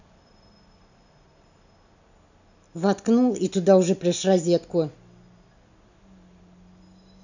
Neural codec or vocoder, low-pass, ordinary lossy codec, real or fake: none; 7.2 kHz; none; real